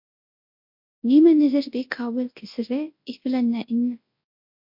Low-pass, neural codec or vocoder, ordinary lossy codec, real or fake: 5.4 kHz; codec, 24 kHz, 0.9 kbps, WavTokenizer, large speech release; MP3, 32 kbps; fake